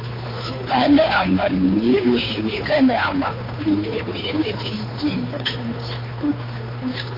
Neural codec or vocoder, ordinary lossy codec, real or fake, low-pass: codec, 16 kHz, 2 kbps, FunCodec, trained on Chinese and English, 25 frames a second; AAC, 24 kbps; fake; 5.4 kHz